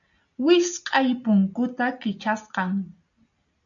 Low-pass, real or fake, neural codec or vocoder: 7.2 kHz; real; none